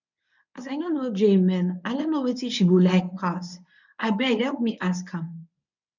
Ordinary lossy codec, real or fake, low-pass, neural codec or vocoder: none; fake; 7.2 kHz; codec, 24 kHz, 0.9 kbps, WavTokenizer, medium speech release version 1